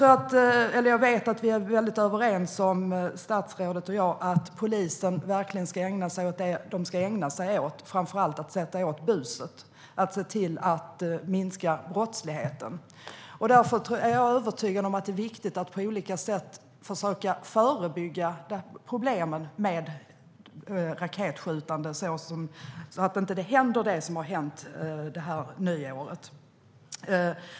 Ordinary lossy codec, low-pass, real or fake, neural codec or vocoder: none; none; real; none